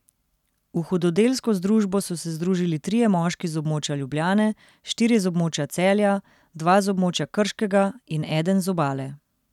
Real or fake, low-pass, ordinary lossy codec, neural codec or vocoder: real; 19.8 kHz; none; none